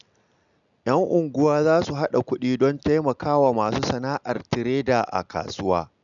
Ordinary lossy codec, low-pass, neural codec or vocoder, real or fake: none; 7.2 kHz; none; real